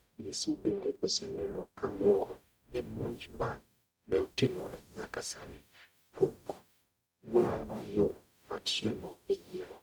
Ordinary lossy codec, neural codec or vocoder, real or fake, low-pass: none; codec, 44.1 kHz, 0.9 kbps, DAC; fake; none